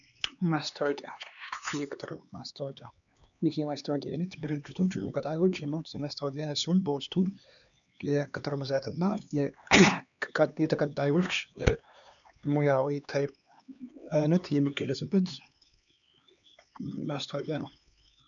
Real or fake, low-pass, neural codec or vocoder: fake; 7.2 kHz; codec, 16 kHz, 2 kbps, X-Codec, HuBERT features, trained on LibriSpeech